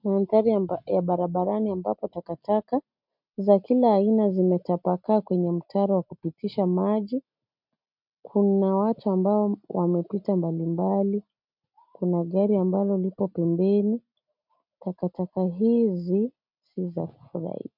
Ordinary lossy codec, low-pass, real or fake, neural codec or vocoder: MP3, 48 kbps; 5.4 kHz; real; none